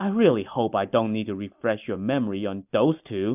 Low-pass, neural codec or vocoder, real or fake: 3.6 kHz; none; real